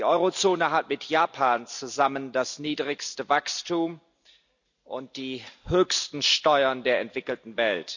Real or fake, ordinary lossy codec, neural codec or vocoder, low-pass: real; none; none; 7.2 kHz